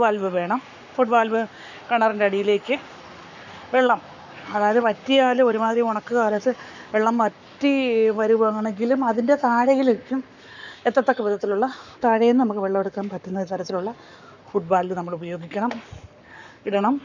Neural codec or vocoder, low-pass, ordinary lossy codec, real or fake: codec, 44.1 kHz, 7.8 kbps, Pupu-Codec; 7.2 kHz; none; fake